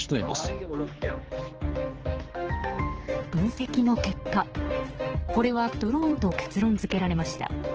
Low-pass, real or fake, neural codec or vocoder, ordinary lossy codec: 7.2 kHz; fake; codec, 16 kHz, 4 kbps, X-Codec, HuBERT features, trained on general audio; Opus, 16 kbps